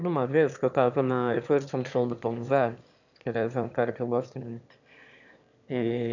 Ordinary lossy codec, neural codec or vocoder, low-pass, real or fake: none; autoencoder, 22.05 kHz, a latent of 192 numbers a frame, VITS, trained on one speaker; 7.2 kHz; fake